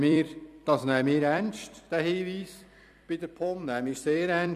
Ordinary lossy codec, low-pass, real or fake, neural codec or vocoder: none; 14.4 kHz; fake; vocoder, 44.1 kHz, 128 mel bands every 256 samples, BigVGAN v2